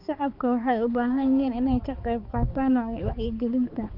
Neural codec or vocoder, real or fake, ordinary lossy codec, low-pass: codec, 16 kHz, 4 kbps, X-Codec, HuBERT features, trained on balanced general audio; fake; Opus, 24 kbps; 5.4 kHz